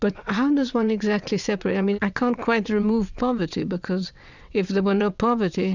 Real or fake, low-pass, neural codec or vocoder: fake; 7.2 kHz; vocoder, 22.05 kHz, 80 mel bands, WaveNeXt